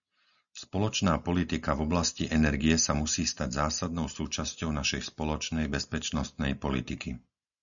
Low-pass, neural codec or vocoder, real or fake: 7.2 kHz; none; real